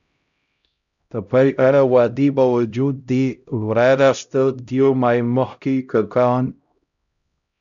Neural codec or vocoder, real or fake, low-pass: codec, 16 kHz, 0.5 kbps, X-Codec, HuBERT features, trained on LibriSpeech; fake; 7.2 kHz